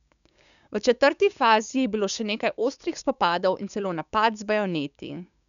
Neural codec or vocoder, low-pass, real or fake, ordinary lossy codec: codec, 16 kHz, 6 kbps, DAC; 7.2 kHz; fake; none